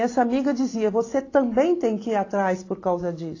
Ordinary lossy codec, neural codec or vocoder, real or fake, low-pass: AAC, 32 kbps; none; real; 7.2 kHz